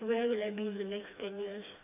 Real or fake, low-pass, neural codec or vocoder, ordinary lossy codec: fake; 3.6 kHz; codec, 16 kHz, 2 kbps, FreqCodec, smaller model; AAC, 32 kbps